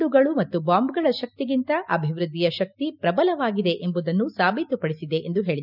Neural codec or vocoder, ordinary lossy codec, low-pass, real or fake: none; none; 5.4 kHz; real